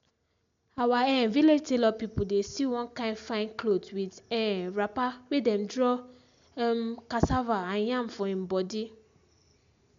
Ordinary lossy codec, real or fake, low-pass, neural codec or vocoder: MP3, 64 kbps; real; 7.2 kHz; none